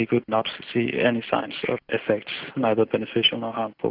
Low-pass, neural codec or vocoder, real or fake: 5.4 kHz; none; real